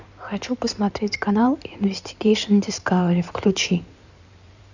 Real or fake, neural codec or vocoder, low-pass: fake; codec, 16 kHz in and 24 kHz out, 2.2 kbps, FireRedTTS-2 codec; 7.2 kHz